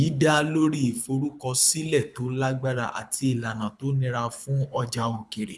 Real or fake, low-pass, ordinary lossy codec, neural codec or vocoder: fake; none; none; codec, 24 kHz, 6 kbps, HILCodec